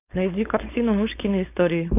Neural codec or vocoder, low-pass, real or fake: codec, 16 kHz, 4.8 kbps, FACodec; 3.6 kHz; fake